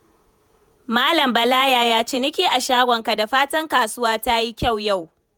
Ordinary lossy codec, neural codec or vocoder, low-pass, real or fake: none; vocoder, 48 kHz, 128 mel bands, Vocos; none; fake